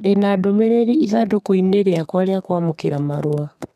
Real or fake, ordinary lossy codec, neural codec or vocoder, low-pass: fake; none; codec, 32 kHz, 1.9 kbps, SNAC; 14.4 kHz